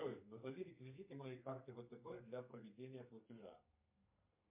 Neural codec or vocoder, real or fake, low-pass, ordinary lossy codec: codec, 32 kHz, 1.9 kbps, SNAC; fake; 3.6 kHz; MP3, 32 kbps